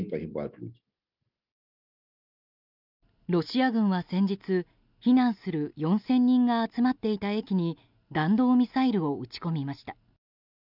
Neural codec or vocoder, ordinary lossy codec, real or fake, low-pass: none; none; real; 5.4 kHz